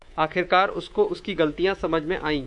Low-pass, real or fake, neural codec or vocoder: 10.8 kHz; fake; codec, 24 kHz, 3.1 kbps, DualCodec